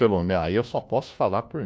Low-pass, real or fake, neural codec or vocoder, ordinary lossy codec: none; fake; codec, 16 kHz, 1 kbps, FunCodec, trained on LibriTTS, 50 frames a second; none